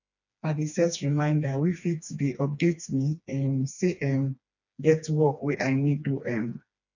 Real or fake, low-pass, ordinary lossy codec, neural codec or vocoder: fake; 7.2 kHz; none; codec, 16 kHz, 2 kbps, FreqCodec, smaller model